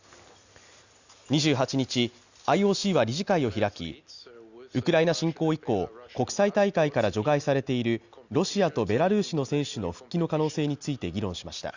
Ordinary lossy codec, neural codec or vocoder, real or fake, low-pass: Opus, 64 kbps; none; real; 7.2 kHz